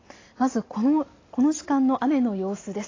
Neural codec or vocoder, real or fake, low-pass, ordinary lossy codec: none; real; 7.2 kHz; AAC, 32 kbps